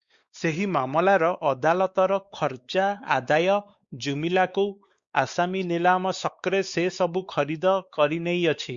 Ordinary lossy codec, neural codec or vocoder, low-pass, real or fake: Opus, 64 kbps; codec, 16 kHz, 4 kbps, X-Codec, WavLM features, trained on Multilingual LibriSpeech; 7.2 kHz; fake